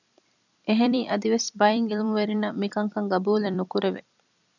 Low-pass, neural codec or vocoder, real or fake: 7.2 kHz; vocoder, 44.1 kHz, 128 mel bands every 256 samples, BigVGAN v2; fake